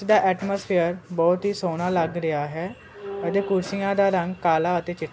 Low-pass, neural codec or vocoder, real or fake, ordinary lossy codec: none; none; real; none